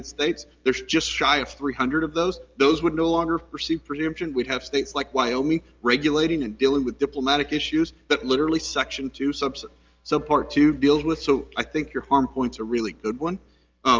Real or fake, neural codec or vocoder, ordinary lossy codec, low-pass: real; none; Opus, 32 kbps; 7.2 kHz